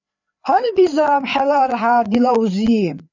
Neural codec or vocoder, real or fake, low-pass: codec, 16 kHz, 4 kbps, FreqCodec, larger model; fake; 7.2 kHz